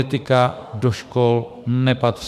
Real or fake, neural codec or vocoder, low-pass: fake; autoencoder, 48 kHz, 32 numbers a frame, DAC-VAE, trained on Japanese speech; 14.4 kHz